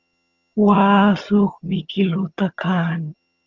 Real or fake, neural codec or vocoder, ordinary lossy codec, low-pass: fake; vocoder, 22.05 kHz, 80 mel bands, HiFi-GAN; Opus, 32 kbps; 7.2 kHz